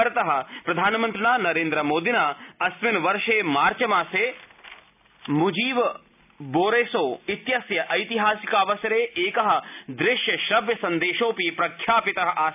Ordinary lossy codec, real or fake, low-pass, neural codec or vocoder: none; real; 3.6 kHz; none